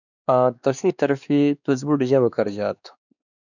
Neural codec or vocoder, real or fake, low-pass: codec, 16 kHz, 2 kbps, X-Codec, WavLM features, trained on Multilingual LibriSpeech; fake; 7.2 kHz